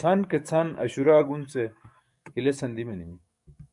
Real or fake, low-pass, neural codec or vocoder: fake; 10.8 kHz; codec, 44.1 kHz, 7.8 kbps, DAC